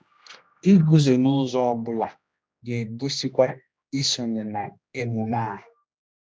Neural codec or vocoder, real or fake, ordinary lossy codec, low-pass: codec, 16 kHz, 1 kbps, X-Codec, HuBERT features, trained on general audio; fake; none; none